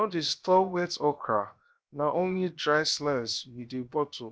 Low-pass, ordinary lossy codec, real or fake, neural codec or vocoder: none; none; fake; codec, 16 kHz, 0.7 kbps, FocalCodec